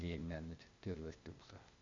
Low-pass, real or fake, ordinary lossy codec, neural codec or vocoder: 7.2 kHz; fake; MP3, 64 kbps; codec, 16 kHz, 0.8 kbps, ZipCodec